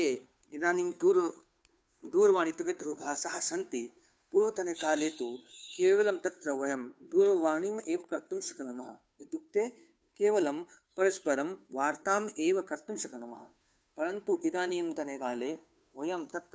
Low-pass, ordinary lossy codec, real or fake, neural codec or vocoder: none; none; fake; codec, 16 kHz, 2 kbps, FunCodec, trained on Chinese and English, 25 frames a second